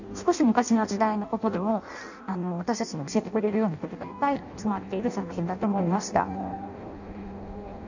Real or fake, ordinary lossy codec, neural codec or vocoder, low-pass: fake; none; codec, 16 kHz in and 24 kHz out, 0.6 kbps, FireRedTTS-2 codec; 7.2 kHz